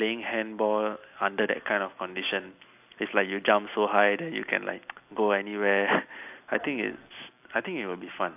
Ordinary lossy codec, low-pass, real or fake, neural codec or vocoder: AAC, 32 kbps; 3.6 kHz; real; none